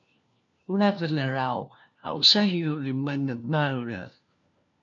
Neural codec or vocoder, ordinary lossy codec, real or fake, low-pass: codec, 16 kHz, 1 kbps, FunCodec, trained on LibriTTS, 50 frames a second; MP3, 64 kbps; fake; 7.2 kHz